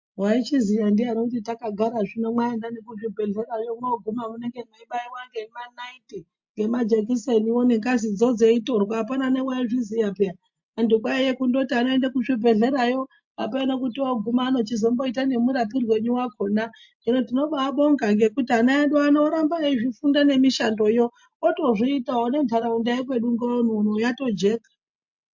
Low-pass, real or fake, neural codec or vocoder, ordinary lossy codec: 7.2 kHz; real; none; MP3, 48 kbps